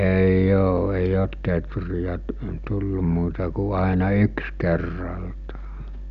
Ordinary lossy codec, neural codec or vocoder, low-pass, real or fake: none; none; 7.2 kHz; real